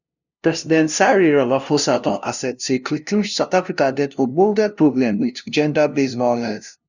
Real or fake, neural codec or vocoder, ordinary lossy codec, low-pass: fake; codec, 16 kHz, 0.5 kbps, FunCodec, trained on LibriTTS, 25 frames a second; none; 7.2 kHz